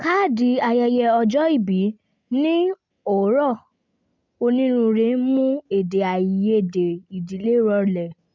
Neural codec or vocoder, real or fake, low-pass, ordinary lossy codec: none; real; 7.2 kHz; MP3, 64 kbps